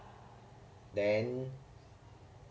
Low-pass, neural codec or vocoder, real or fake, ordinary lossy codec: none; none; real; none